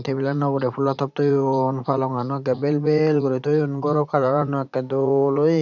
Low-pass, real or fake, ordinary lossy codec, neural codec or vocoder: 7.2 kHz; fake; none; vocoder, 44.1 kHz, 80 mel bands, Vocos